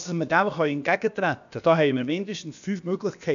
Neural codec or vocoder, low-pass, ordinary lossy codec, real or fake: codec, 16 kHz, about 1 kbps, DyCAST, with the encoder's durations; 7.2 kHz; AAC, 96 kbps; fake